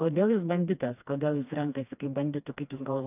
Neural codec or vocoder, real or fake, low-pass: codec, 16 kHz, 2 kbps, FreqCodec, smaller model; fake; 3.6 kHz